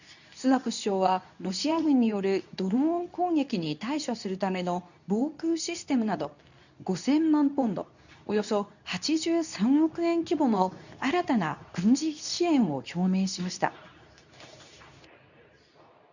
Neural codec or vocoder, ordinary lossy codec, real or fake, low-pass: codec, 24 kHz, 0.9 kbps, WavTokenizer, medium speech release version 2; none; fake; 7.2 kHz